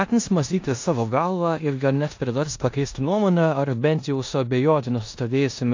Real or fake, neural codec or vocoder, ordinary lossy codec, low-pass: fake; codec, 16 kHz in and 24 kHz out, 0.9 kbps, LongCat-Audio-Codec, four codebook decoder; AAC, 48 kbps; 7.2 kHz